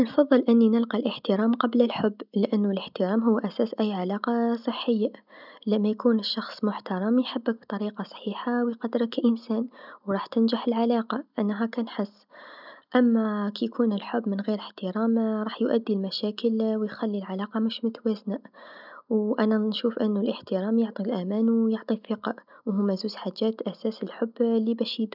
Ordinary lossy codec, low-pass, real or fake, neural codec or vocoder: none; 5.4 kHz; real; none